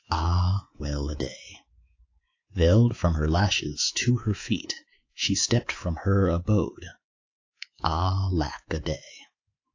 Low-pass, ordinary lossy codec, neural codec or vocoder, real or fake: 7.2 kHz; AAC, 48 kbps; codec, 24 kHz, 3.1 kbps, DualCodec; fake